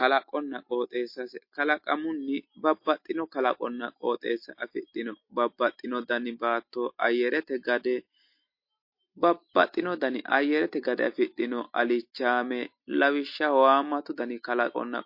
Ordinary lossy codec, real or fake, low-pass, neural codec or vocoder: MP3, 32 kbps; real; 5.4 kHz; none